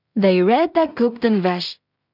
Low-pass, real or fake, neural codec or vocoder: 5.4 kHz; fake; codec, 16 kHz in and 24 kHz out, 0.4 kbps, LongCat-Audio-Codec, two codebook decoder